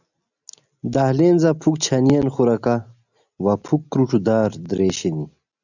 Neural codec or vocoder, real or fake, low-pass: none; real; 7.2 kHz